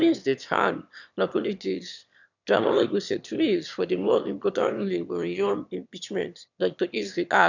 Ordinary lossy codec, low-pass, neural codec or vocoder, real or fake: none; 7.2 kHz; autoencoder, 22.05 kHz, a latent of 192 numbers a frame, VITS, trained on one speaker; fake